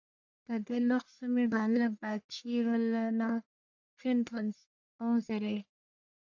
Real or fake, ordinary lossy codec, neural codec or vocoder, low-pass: fake; AAC, 48 kbps; codec, 44.1 kHz, 1.7 kbps, Pupu-Codec; 7.2 kHz